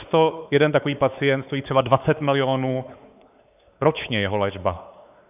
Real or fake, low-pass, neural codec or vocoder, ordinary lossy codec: fake; 3.6 kHz; codec, 16 kHz, 4 kbps, X-Codec, WavLM features, trained on Multilingual LibriSpeech; AAC, 32 kbps